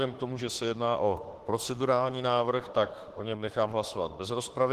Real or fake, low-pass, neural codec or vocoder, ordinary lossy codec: fake; 14.4 kHz; autoencoder, 48 kHz, 32 numbers a frame, DAC-VAE, trained on Japanese speech; Opus, 16 kbps